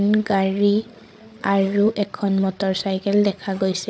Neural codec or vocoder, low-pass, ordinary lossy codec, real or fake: codec, 16 kHz, 16 kbps, FreqCodec, larger model; none; none; fake